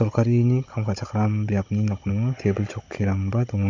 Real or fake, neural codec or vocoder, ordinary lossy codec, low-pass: fake; codec, 16 kHz, 16 kbps, FunCodec, trained on Chinese and English, 50 frames a second; MP3, 48 kbps; 7.2 kHz